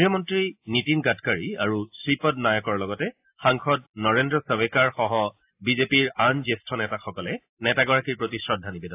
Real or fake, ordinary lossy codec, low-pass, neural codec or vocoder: real; AAC, 32 kbps; 3.6 kHz; none